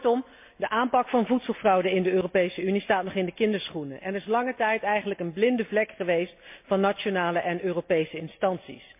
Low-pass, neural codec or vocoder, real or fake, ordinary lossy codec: 3.6 kHz; none; real; MP3, 32 kbps